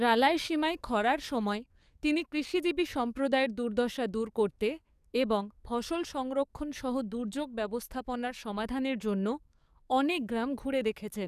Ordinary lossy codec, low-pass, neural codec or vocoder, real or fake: none; 14.4 kHz; codec, 44.1 kHz, 7.8 kbps, DAC; fake